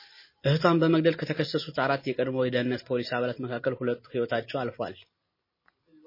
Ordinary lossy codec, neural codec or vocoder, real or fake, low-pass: MP3, 24 kbps; none; real; 5.4 kHz